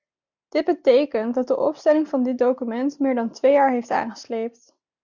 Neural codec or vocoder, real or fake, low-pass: none; real; 7.2 kHz